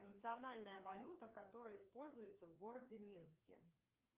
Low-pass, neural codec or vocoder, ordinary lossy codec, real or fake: 3.6 kHz; codec, 16 kHz, 2 kbps, FreqCodec, larger model; Opus, 24 kbps; fake